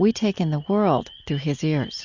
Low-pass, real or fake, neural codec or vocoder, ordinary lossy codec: 7.2 kHz; real; none; Opus, 64 kbps